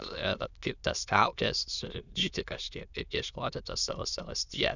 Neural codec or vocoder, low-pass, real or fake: autoencoder, 22.05 kHz, a latent of 192 numbers a frame, VITS, trained on many speakers; 7.2 kHz; fake